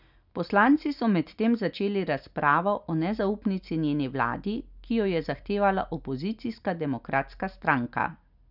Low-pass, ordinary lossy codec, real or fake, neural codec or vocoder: 5.4 kHz; none; real; none